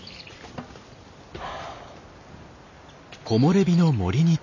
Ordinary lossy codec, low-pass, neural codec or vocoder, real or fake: none; 7.2 kHz; none; real